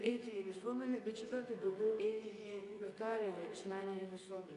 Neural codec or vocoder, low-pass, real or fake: codec, 24 kHz, 0.9 kbps, WavTokenizer, medium music audio release; 10.8 kHz; fake